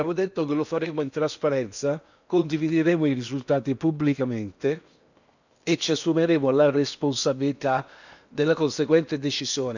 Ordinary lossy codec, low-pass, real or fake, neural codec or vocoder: none; 7.2 kHz; fake; codec, 16 kHz in and 24 kHz out, 0.8 kbps, FocalCodec, streaming, 65536 codes